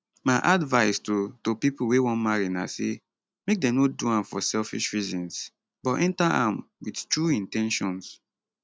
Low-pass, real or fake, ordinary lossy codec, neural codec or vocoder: none; real; none; none